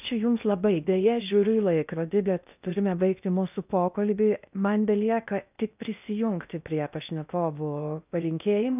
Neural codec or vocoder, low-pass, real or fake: codec, 16 kHz in and 24 kHz out, 0.6 kbps, FocalCodec, streaming, 2048 codes; 3.6 kHz; fake